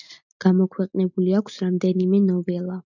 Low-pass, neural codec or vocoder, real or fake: 7.2 kHz; none; real